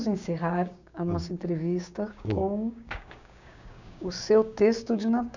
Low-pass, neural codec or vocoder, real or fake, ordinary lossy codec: 7.2 kHz; codec, 16 kHz, 6 kbps, DAC; fake; none